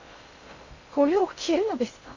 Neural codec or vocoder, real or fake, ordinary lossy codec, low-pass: codec, 16 kHz in and 24 kHz out, 0.6 kbps, FocalCodec, streaming, 2048 codes; fake; Opus, 64 kbps; 7.2 kHz